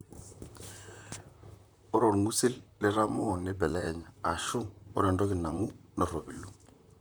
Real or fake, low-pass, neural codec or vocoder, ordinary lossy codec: fake; none; vocoder, 44.1 kHz, 128 mel bands, Pupu-Vocoder; none